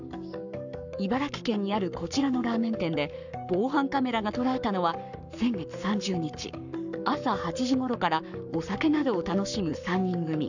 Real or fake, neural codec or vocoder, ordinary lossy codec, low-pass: fake; codec, 44.1 kHz, 7.8 kbps, Pupu-Codec; none; 7.2 kHz